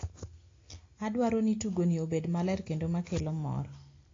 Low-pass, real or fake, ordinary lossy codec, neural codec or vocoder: 7.2 kHz; real; AAC, 32 kbps; none